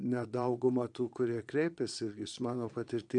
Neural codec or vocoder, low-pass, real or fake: vocoder, 22.05 kHz, 80 mel bands, Vocos; 9.9 kHz; fake